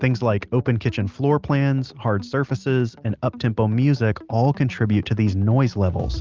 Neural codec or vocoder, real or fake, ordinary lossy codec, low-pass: none; real; Opus, 32 kbps; 7.2 kHz